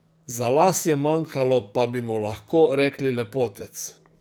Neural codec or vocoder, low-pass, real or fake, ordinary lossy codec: codec, 44.1 kHz, 2.6 kbps, SNAC; none; fake; none